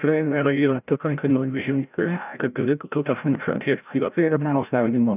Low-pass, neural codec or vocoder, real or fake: 3.6 kHz; codec, 16 kHz, 0.5 kbps, FreqCodec, larger model; fake